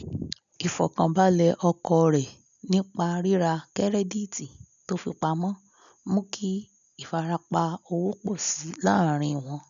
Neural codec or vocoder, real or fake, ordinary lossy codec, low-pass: none; real; none; 7.2 kHz